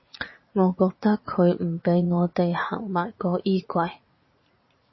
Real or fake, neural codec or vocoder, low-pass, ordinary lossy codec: fake; vocoder, 44.1 kHz, 80 mel bands, Vocos; 7.2 kHz; MP3, 24 kbps